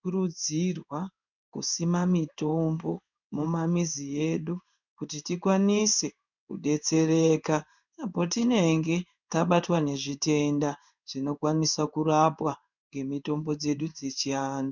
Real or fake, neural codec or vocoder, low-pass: fake; codec, 16 kHz in and 24 kHz out, 1 kbps, XY-Tokenizer; 7.2 kHz